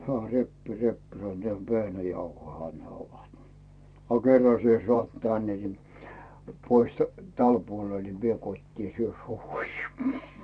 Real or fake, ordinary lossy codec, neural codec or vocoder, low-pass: fake; none; vocoder, 44.1 kHz, 128 mel bands every 512 samples, BigVGAN v2; 9.9 kHz